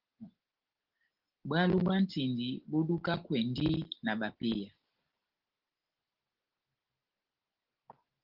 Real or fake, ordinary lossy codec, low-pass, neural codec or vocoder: real; Opus, 16 kbps; 5.4 kHz; none